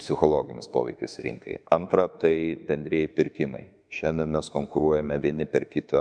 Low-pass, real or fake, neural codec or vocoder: 9.9 kHz; fake; autoencoder, 48 kHz, 32 numbers a frame, DAC-VAE, trained on Japanese speech